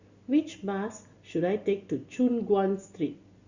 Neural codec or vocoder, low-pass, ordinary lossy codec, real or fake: none; 7.2 kHz; Opus, 64 kbps; real